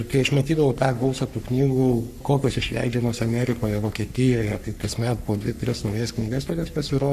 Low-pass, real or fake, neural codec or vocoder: 14.4 kHz; fake; codec, 44.1 kHz, 3.4 kbps, Pupu-Codec